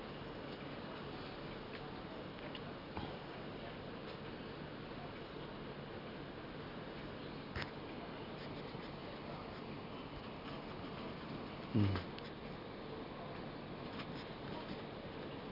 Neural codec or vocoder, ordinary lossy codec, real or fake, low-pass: none; none; real; 5.4 kHz